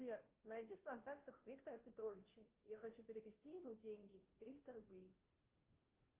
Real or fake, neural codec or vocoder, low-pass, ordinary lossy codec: fake; codec, 16 kHz, 0.5 kbps, FunCodec, trained on Chinese and English, 25 frames a second; 3.6 kHz; Opus, 16 kbps